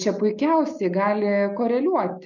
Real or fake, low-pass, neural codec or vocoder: real; 7.2 kHz; none